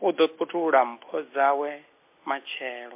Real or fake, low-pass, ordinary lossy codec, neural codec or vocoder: real; 3.6 kHz; MP3, 24 kbps; none